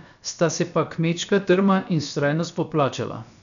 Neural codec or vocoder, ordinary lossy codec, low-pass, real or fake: codec, 16 kHz, about 1 kbps, DyCAST, with the encoder's durations; none; 7.2 kHz; fake